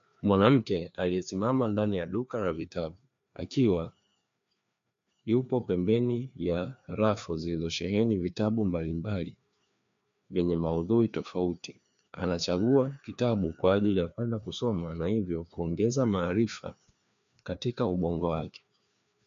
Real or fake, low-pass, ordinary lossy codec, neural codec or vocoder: fake; 7.2 kHz; MP3, 64 kbps; codec, 16 kHz, 2 kbps, FreqCodec, larger model